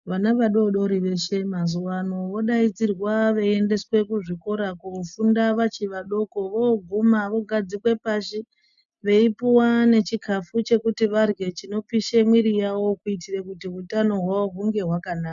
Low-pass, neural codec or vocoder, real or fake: 7.2 kHz; none; real